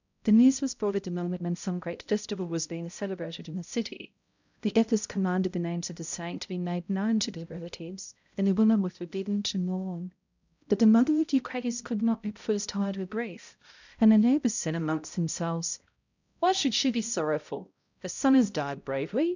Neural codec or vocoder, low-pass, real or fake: codec, 16 kHz, 0.5 kbps, X-Codec, HuBERT features, trained on balanced general audio; 7.2 kHz; fake